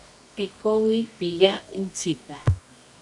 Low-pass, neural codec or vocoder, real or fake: 10.8 kHz; codec, 24 kHz, 0.9 kbps, WavTokenizer, medium music audio release; fake